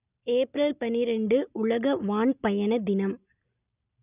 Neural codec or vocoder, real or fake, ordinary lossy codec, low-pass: none; real; none; 3.6 kHz